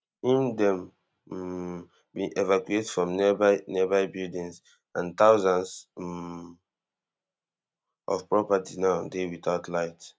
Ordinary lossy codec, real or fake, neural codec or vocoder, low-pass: none; real; none; none